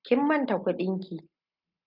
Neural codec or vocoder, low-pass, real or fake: none; 5.4 kHz; real